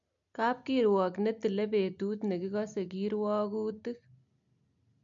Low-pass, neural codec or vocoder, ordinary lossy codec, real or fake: 7.2 kHz; none; MP3, 64 kbps; real